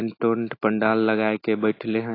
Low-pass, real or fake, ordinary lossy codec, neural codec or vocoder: 5.4 kHz; real; AAC, 24 kbps; none